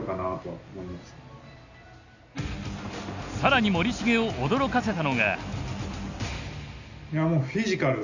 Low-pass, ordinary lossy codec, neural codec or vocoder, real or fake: 7.2 kHz; none; none; real